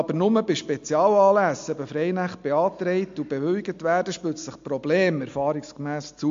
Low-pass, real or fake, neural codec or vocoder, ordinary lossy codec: 7.2 kHz; real; none; MP3, 48 kbps